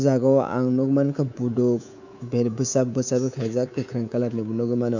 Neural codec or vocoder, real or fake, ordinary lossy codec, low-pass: codec, 24 kHz, 3.1 kbps, DualCodec; fake; none; 7.2 kHz